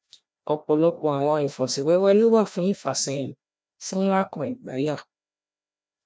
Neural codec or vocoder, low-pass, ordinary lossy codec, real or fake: codec, 16 kHz, 1 kbps, FreqCodec, larger model; none; none; fake